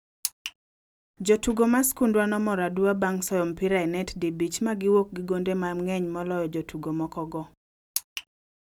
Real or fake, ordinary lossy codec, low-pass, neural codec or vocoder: real; none; 19.8 kHz; none